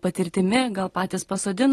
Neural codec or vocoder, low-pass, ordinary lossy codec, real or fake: none; 19.8 kHz; AAC, 32 kbps; real